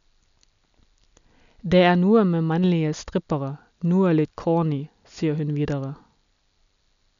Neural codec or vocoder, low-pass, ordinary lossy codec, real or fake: none; 7.2 kHz; none; real